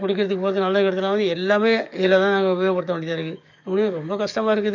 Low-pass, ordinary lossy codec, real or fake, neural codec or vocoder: 7.2 kHz; none; fake; codec, 44.1 kHz, 7.8 kbps, DAC